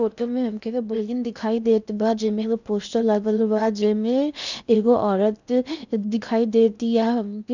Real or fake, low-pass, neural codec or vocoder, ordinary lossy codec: fake; 7.2 kHz; codec, 16 kHz in and 24 kHz out, 0.8 kbps, FocalCodec, streaming, 65536 codes; none